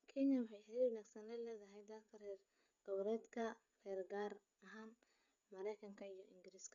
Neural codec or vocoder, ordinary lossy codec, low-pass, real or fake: codec, 16 kHz, 16 kbps, FreqCodec, smaller model; none; 7.2 kHz; fake